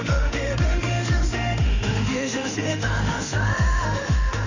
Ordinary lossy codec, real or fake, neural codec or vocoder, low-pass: none; fake; autoencoder, 48 kHz, 32 numbers a frame, DAC-VAE, trained on Japanese speech; 7.2 kHz